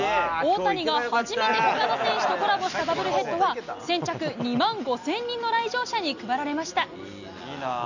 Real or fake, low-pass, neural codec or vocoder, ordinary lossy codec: real; 7.2 kHz; none; none